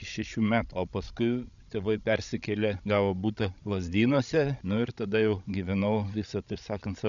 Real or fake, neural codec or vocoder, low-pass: fake; codec, 16 kHz, 16 kbps, FreqCodec, larger model; 7.2 kHz